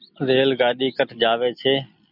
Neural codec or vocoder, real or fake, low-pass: none; real; 5.4 kHz